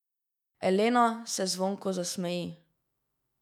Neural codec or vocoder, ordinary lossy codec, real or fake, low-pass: autoencoder, 48 kHz, 128 numbers a frame, DAC-VAE, trained on Japanese speech; none; fake; 19.8 kHz